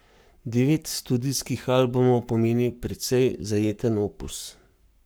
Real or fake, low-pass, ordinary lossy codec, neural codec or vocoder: fake; none; none; codec, 44.1 kHz, 7.8 kbps, Pupu-Codec